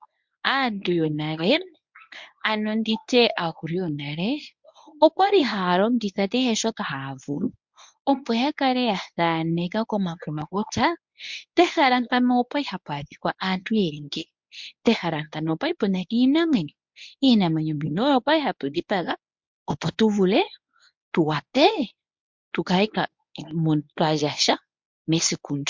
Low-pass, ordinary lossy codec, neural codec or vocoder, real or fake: 7.2 kHz; MP3, 64 kbps; codec, 24 kHz, 0.9 kbps, WavTokenizer, medium speech release version 1; fake